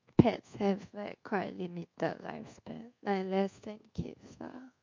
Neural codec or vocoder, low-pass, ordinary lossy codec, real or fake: codec, 24 kHz, 1.2 kbps, DualCodec; 7.2 kHz; MP3, 48 kbps; fake